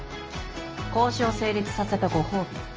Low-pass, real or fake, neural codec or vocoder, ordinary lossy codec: 7.2 kHz; real; none; Opus, 24 kbps